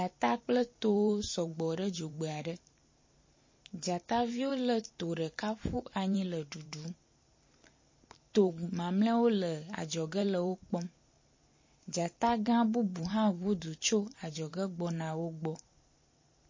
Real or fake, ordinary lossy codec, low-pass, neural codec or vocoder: fake; MP3, 32 kbps; 7.2 kHz; vocoder, 44.1 kHz, 128 mel bands every 512 samples, BigVGAN v2